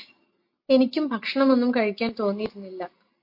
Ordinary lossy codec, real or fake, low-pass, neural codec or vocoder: MP3, 32 kbps; real; 5.4 kHz; none